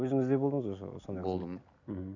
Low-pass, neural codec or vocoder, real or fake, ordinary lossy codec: 7.2 kHz; none; real; none